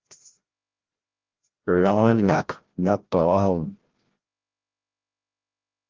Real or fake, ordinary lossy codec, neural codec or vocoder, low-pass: fake; Opus, 16 kbps; codec, 16 kHz, 0.5 kbps, FreqCodec, larger model; 7.2 kHz